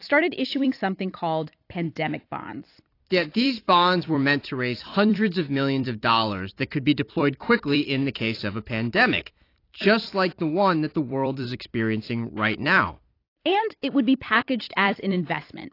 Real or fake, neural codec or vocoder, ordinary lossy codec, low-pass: fake; vocoder, 44.1 kHz, 128 mel bands every 256 samples, BigVGAN v2; AAC, 32 kbps; 5.4 kHz